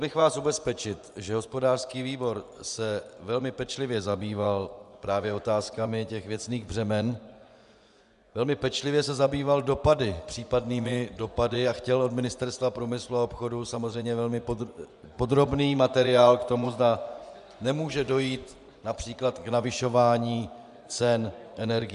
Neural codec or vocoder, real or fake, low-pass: vocoder, 24 kHz, 100 mel bands, Vocos; fake; 10.8 kHz